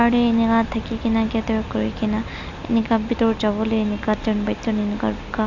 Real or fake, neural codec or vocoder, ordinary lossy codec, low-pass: real; none; none; 7.2 kHz